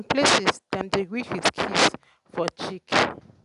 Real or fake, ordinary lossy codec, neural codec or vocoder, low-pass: real; none; none; 10.8 kHz